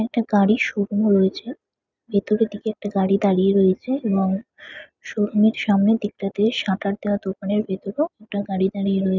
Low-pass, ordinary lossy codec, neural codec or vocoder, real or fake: 7.2 kHz; none; none; real